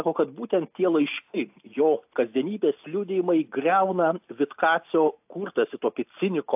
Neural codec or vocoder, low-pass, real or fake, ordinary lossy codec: none; 3.6 kHz; real; AAC, 32 kbps